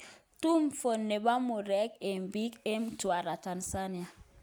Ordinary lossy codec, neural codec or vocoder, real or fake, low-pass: none; none; real; none